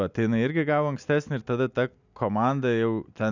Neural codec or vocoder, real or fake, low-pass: none; real; 7.2 kHz